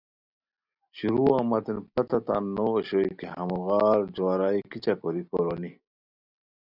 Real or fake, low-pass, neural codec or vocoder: real; 5.4 kHz; none